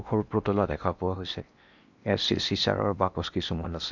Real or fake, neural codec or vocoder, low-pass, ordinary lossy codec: fake; codec, 16 kHz in and 24 kHz out, 0.8 kbps, FocalCodec, streaming, 65536 codes; 7.2 kHz; none